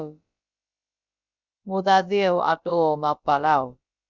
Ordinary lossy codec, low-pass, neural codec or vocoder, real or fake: Opus, 64 kbps; 7.2 kHz; codec, 16 kHz, about 1 kbps, DyCAST, with the encoder's durations; fake